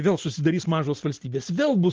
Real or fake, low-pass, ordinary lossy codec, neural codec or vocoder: real; 7.2 kHz; Opus, 16 kbps; none